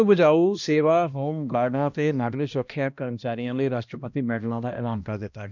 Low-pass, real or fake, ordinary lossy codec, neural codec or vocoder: 7.2 kHz; fake; none; codec, 16 kHz, 1 kbps, X-Codec, HuBERT features, trained on balanced general audio